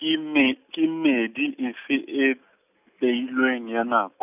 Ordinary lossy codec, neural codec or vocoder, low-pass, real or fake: none; none; 3.6 kHz; real